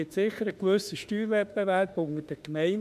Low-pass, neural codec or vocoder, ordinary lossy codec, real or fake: 14.4 kHz; autoencoder, 48 kHz, 32 numbers a frame, DAC-VAE, trained on Japanese speech; MP3, 96 kbps; fake